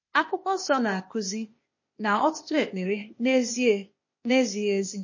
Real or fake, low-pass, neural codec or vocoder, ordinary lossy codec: fake; 7.2 kHz; codec, 16 kHz, 0.8 kbps, ZipCodec; MP3, 32 kbps